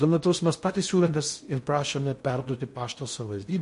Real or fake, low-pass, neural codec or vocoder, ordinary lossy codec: fake; 10.8 kHz; codec, 16 kHz in and 24 kHz out, 0.6 kbps, FocalCodec, streaming, 4096 codes; MP3, 48 kbps